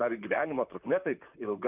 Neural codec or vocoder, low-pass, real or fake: vocoder, 44.1 kHz, 128 mel bands, Pupu-Vocoder; 3.6 kHz; fake